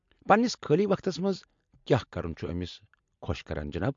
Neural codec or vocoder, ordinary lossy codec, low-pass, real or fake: none; MP3, 48 kbps; 7.2 kHz; real